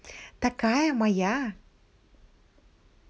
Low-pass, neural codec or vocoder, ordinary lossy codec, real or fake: none; none; none; real